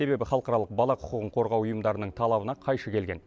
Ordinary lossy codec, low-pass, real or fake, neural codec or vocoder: none; none; real; none